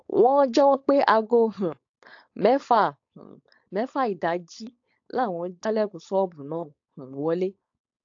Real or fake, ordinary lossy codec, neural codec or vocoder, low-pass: fake; AAC, 48 kbps; codec, 16 kHz, 4.8 kbps, FACodec; 7.2 kHz